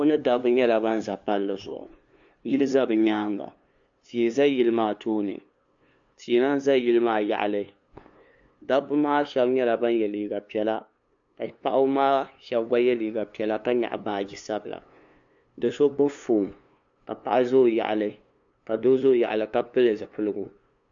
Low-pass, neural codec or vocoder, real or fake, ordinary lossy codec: 7.2 kHz; codec, 16 kHz, 2 kbps, FunCodec, trained on LibriTTS, 25 frames a second; fake; AAC, 64 kbps